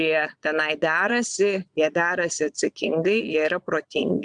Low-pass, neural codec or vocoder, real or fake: 9.9 kHz; vocoder, 22.05 kHz, 80 mel bands, Vocos; fake